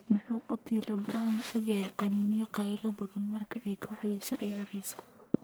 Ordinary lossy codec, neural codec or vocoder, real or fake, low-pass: none; codec, 44.1 kHz, 1.7 kbps, Pupu-Codec; fake; none